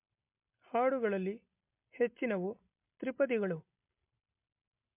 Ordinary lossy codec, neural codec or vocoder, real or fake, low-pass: MP3, 32 kbps; none; real; 3.6 kHz